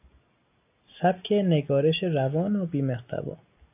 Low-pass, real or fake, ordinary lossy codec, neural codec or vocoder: 3.6 kHz; real; AAC, 32 kbps; none